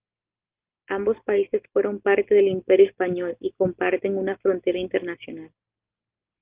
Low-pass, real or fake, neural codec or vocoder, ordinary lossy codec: 3.6 kHz; real; none; Opus, 32 kbps